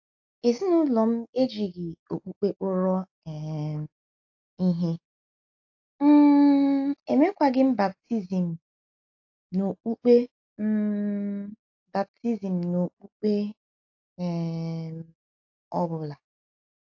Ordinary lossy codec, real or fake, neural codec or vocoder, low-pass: AAC, 48 kbps; real; none; 7.2 kHz